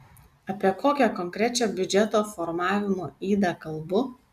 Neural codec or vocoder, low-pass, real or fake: none; 14.4 kHz; real